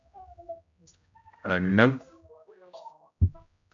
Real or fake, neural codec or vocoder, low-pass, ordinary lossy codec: fake; codec, 16 kHz, 0.5 kbps, X-Codec, HuBERT features, trained on general audio; 7.2 kHz; MP3, 64 kbps